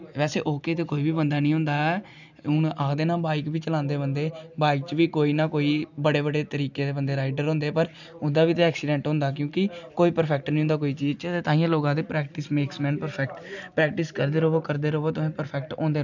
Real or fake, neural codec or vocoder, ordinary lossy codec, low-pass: real; none; none; 7.2 kHz